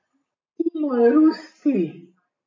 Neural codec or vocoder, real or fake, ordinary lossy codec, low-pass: none; real; AAC, 32 kbps; 7.2 kHz